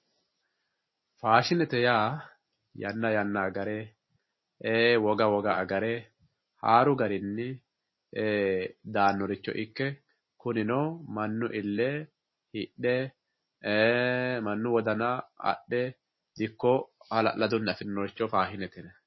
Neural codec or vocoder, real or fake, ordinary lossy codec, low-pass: none; real; MP3, 24 kbps; 7.2 kHz